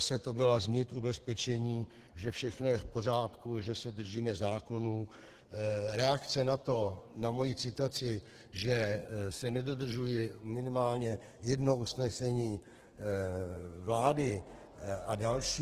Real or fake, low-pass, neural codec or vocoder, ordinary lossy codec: fake; 14.4 kHz; codec, 32 kHz, 1.9 kbps, SNAC; Opus, 16 kbps